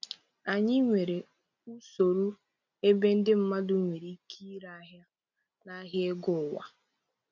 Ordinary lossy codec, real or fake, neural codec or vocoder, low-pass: none; real; none; 7.2 kHz